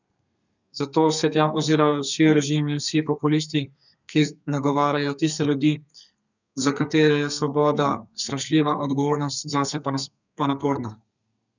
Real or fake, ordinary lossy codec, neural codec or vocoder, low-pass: fake; none; codec, 32 kHz, 1.9 kbps, SNAC; 7.2 kHz